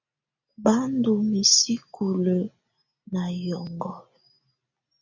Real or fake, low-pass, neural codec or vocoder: real; 7.2 kHz; none